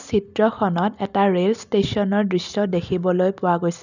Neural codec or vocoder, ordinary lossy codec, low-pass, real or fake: none; none; 7.2 kHz; real